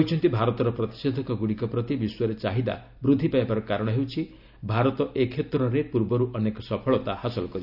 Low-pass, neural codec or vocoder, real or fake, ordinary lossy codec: 5.4 kHz; none; real; none